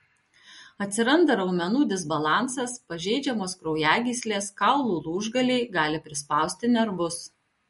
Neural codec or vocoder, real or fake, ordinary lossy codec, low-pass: none; real; MP3, 48 kbps; 19.8 kHz